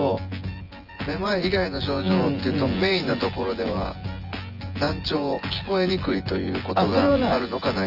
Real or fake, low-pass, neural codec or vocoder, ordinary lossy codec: fake; 5.4 kHz; vocoder, 24 kHz, 100 mel bands, Vocos; Opus, 24 kbps